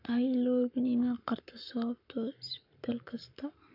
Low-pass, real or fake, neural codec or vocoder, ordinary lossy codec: 5.4 kHz; fake; codec, 44.1 kHz, 7.8 kbps, Pupu-Codec; none